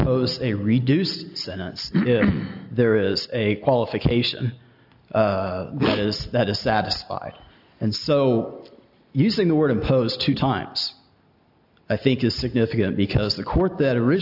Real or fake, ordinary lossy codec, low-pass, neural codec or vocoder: real; AAC, 48 kbps; 5.4 kHz; none